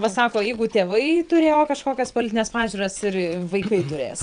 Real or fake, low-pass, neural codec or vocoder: fake; 9.9 kHz; vocoder, 22.05 kHz, 80 mel bands, WaveNeXt